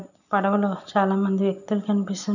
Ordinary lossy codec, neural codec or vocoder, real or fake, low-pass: none; none; real; 7.2 kHz